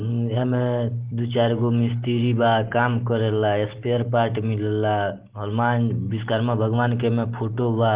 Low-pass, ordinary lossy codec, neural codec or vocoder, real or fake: 3.6 kHz; Opus, 16 kbps; none; real